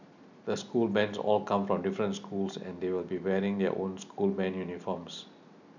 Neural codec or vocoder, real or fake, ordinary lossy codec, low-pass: none; real; none; 7.2 kHz